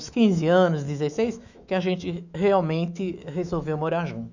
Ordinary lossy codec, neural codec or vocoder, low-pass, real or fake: none; autoencoder, 48 kHz, 128 numbers a frame, DAC-VAE, trained on Japanese speech; 7.2 kHz; fake